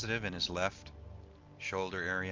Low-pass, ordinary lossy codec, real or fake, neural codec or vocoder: 7.2 kHz; Opus, 24 kbps; real; none